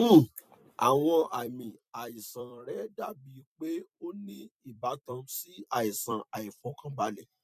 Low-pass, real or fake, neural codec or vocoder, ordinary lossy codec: 14.4 kHz; fake; vocoder, 44.1 kHz, 128 mel bands every 256 samples, BigVGAN v2; MP3, 96 kbps